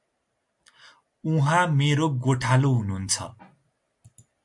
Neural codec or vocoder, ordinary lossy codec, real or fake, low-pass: none; AAC, 64 kbps; real; 10.8 kHz